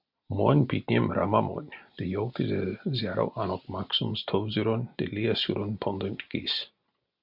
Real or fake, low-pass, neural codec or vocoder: real; 5.4 kHz; none